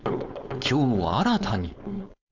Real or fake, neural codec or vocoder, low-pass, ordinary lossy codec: fake; codec, 16 kHz, 4.8 kbps, FACodec; 7.2 kHz; none